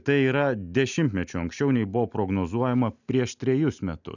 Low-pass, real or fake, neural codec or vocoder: 7.2 kHz; real; none